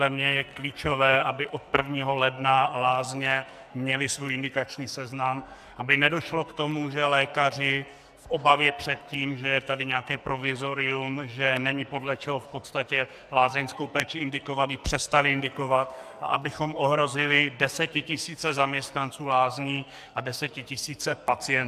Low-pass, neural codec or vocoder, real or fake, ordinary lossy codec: 14.4 kHz; codec, 44.1 kHz, 2.6 kbps, SNAC; fake; AAC, 96 kbps